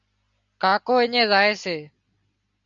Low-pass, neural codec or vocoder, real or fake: 7.2 kHz; none; real